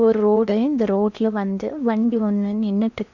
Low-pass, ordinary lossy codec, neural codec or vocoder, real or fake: 7.2 kHz; none; codec, 16 kHz in and 24 kHz out, 0.8 kbps, FocalCodec, streaming, 65536 codes; fake